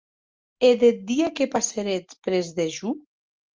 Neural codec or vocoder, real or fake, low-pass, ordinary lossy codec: none; real; 7.2 kHz; Opus, 32 kbps